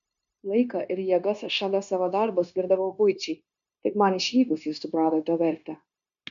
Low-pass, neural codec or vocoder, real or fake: 7.2 kHz; codec, 16 kHz, 0.9 kbps, LongCat-Audio-Codec; fake